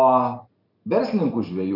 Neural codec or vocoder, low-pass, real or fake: none; 5.4 kHz; real